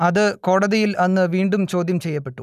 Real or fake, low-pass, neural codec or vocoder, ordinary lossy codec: real; 14.4 kHz; none; none